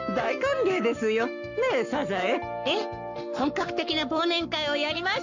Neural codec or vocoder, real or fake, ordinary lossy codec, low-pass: codec, 44.1 kHz, 7.8 kbps, Pupu-Codec; fake; none; 7.2 kHz